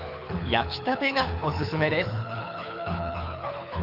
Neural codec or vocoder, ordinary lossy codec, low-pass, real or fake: codec, 24 kHz, 6 kbps, HILCodec; none; 5.4 kHz; fake